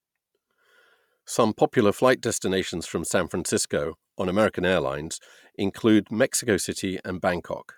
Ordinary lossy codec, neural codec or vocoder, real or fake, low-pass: none; none; real; 19.8 kHz